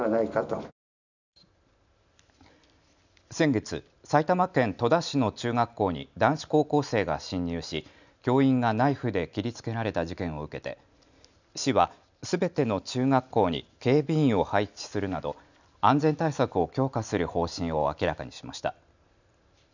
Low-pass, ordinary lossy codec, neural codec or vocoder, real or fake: 7.2 kHz; none; none; real